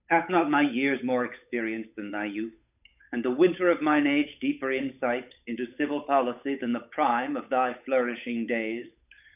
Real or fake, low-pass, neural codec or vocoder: fake; 3.6 kHz; codec, 16 kHz, 8 kbps, FunCodec, trained on Chinese and English, 25 frames a second